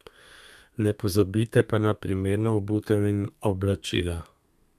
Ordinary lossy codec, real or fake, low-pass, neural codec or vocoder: none; fake; 14.4 kHz; codec, 32 kHz, 1.9 kbps, SNAC